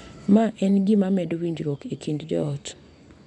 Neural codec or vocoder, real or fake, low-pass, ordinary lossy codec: vocoder, 24 kHz, 100 mel bands, Vocos; fake; 10.8 kHz; none